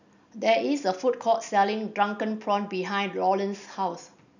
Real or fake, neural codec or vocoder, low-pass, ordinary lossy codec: real; none; 7.2 kHz; none